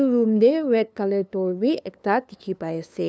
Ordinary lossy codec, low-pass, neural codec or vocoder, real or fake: none; none; codec, 16 kHz, 2 kbps, FunCodec, trained on LibriTTS, 25 frames a second; fake